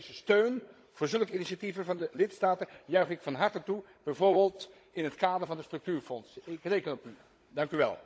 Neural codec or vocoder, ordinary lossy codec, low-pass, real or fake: codec, 16 kHz, 16 kbps, FunCodec, trained on Chinese and English, 50 frames a second; none; none; fake